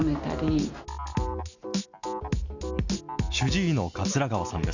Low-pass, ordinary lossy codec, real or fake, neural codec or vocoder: 7.2 kHz; none; real; none